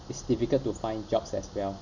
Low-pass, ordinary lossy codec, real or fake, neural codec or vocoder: 7.2 kHz; none; real; none